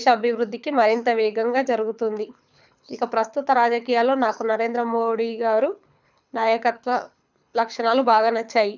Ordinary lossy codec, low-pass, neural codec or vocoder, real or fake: none; 7.2 kHz; codec, 24 kHz, 6 kbps, HILCodec; fake